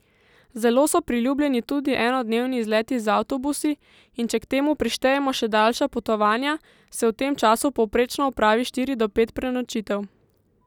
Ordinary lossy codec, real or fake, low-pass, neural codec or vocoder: none; real; 19.8 kHz; none